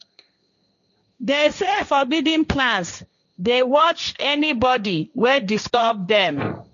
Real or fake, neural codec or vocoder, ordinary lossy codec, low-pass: fake; codec, 16 kHz, 1.1 kbps, Voila-Tokenizer; none; 7.2 kHz